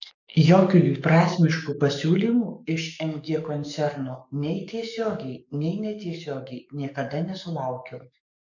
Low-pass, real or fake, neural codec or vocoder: 7.2 kHz; fake; codec, 44.1 kHz, 7.8 kbps, DAC